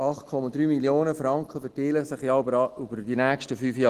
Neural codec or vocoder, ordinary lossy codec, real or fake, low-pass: none; Opus, 16 kbps; real; 14.4 kHz